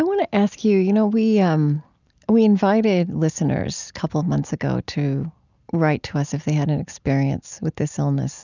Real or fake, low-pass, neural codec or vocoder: real; 7.2 kHz; none